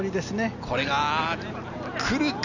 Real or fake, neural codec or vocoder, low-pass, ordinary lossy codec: real; none; 7.2 kHz; none